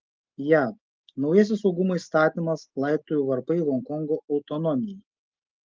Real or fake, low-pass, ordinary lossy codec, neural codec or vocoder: real; 7.2 kHz; Opus, 24 kbps; none